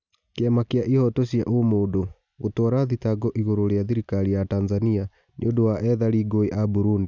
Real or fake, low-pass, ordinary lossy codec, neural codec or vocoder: real; 7.2 kHz; none; none